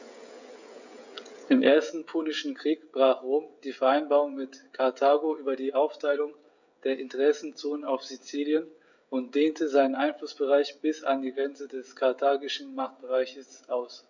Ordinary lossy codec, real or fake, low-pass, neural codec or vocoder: none; fake; 7.2 kHz; codec, 16 kHz, 16 kbps, FreqCodec, smaller model